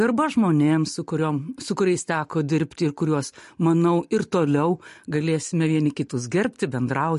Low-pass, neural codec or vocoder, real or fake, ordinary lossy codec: 14.4 kHz; none; real; MP3, 48 kbps